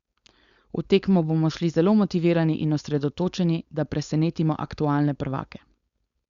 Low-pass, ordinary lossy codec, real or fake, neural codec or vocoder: 7.2 kHz; Opus, 64 kbps; fake; codec, 16 kHz, 4.8 kbps, FACodec